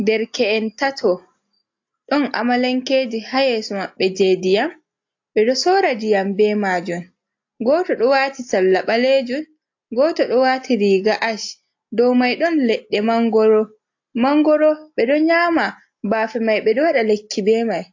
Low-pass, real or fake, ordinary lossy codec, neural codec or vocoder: 7.2 kHz; real; AAC, 48 kbps; none